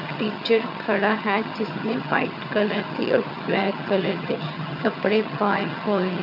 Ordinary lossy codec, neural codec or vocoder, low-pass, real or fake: none; vocoder, 22.05 kHz, 80 mel bands, HiFi-GAN; 5.4 kHz; fake